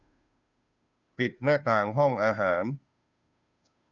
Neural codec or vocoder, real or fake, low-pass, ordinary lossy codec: codec, 16 kHz, 2 kbps, FunCodec, trained on Chinese and English, 25 frames a second; fake; 7.2 kHz; none